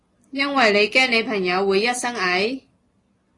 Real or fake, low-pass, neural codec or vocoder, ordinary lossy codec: real; 10.8 kHz; none; AAC, 32 kbps